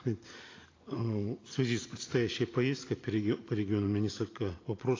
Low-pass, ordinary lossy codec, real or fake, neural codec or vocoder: 7.2 kHz; AAC, 32 kbps; real; none